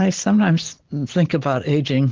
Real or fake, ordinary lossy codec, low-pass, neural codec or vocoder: real; Opus, 16 kbps; 7.2 kHz; none